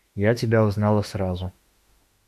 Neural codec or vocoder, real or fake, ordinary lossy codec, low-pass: autoencoder, 48 kHz, 32 numbers a frame, DAC-VAE, trained on Japanese speech; fake; MP3, 96 kbps; 14.4 kHz